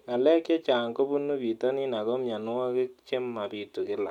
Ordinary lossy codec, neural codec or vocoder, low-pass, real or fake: none; none; 19.8 kHz; real